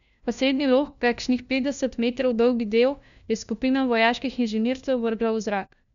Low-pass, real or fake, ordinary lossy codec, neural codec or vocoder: 7.2 kHz; fake; none; codec, 16 kHz, 1 kbps, FunCodec, trained on LibriTTS, 50 frames a second